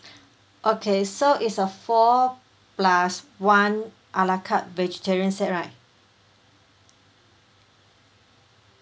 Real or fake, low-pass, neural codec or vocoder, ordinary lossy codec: real; none; none; none